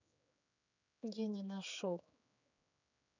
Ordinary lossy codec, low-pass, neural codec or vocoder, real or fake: none; 7.2 kHz; codec, 16 kHz, 4 kbps, X-Codec, HuBERT features, trained on general audio; fake